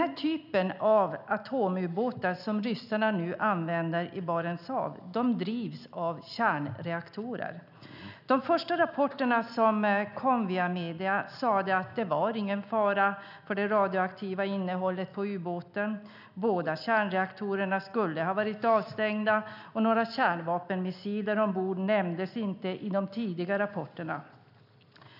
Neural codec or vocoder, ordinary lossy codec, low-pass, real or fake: none; none; 5.4 kHz; real